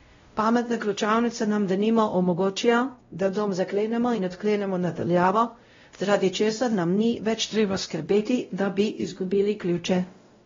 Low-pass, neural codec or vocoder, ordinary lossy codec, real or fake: 7.2 kHz; codec, 16 kHz, 0.5 kbps, X-Codec, WavLM features, trained on Multilingual LibriSpeech; AAC, 24 kbps; fake